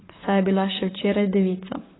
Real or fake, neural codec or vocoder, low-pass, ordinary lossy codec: fake; vocoder, 44.1 kHz, 128 mel bands, Pupu-Vocoder; 7.2 kHz; AAC, 16 kbps